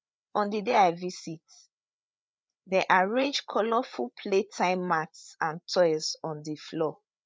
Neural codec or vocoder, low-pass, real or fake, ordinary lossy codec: codec, 16 kHz, 8 kbps, FreqCodec, larger model; none; fake; none